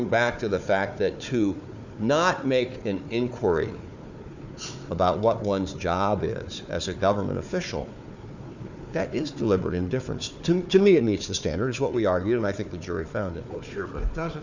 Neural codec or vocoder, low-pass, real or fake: codec, 16 kHz, 4 kbps, FunCodec, trained on Chinese and English, 50 frames a second; 7.2 kHz; fake